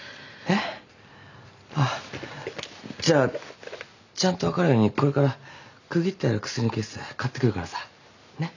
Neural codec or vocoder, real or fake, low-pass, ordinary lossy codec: none; real; 7.2 kHz; none